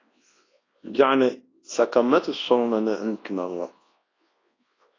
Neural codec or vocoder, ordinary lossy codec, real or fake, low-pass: codec, 24 kHz, 0.9 kbps, WavTokenizer, large speech release; AAC, 32 kbps; fake; 7.2 kHz